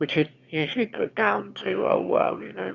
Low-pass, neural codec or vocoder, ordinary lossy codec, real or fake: 7.2 kHz; autoencoder, 22.05 kHz, a latent of 192 numbers a frame, VITS, trained on one speaker; Opus, 64 kbps; fake